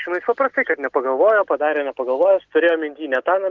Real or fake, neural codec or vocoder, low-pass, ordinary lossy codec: real; none; 7.2 kHz; Opus, 16 kbps